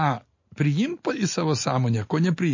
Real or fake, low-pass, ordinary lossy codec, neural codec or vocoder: real; 7.2 kHz; MP3, 32 kbps; none